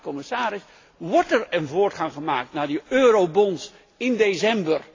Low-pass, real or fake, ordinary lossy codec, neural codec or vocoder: 7.2 kHz; real; AAC, 32 kbps; none